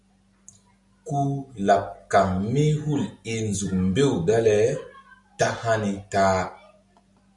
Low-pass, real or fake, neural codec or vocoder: 10.8 kHz; real; none